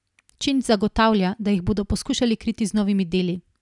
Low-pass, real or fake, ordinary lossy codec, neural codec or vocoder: 10.8 kHz; real; none; none